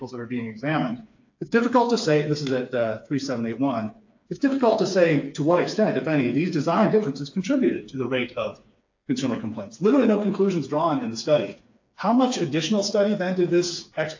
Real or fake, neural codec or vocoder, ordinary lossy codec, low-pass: fake; codec, 16 kHz, 4 kbps, FreqCodec, smaller model; AAC, 48 kbps; 7.2 kHz